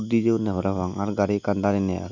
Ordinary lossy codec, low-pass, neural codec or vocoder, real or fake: none; 7.2 kHz; none; real